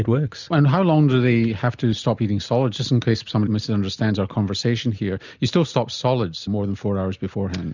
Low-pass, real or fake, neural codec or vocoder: 7.2 kHz; real; none